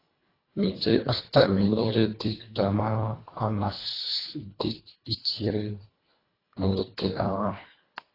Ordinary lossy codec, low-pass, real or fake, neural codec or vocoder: AAC, 24 kbps; 5.4 kHz; fake; codec, 24 kHz, 1.5 kbps, HILCodec